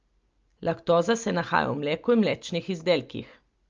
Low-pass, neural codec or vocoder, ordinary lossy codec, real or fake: 7.2 kHz; none; Opus, 24 kbps; real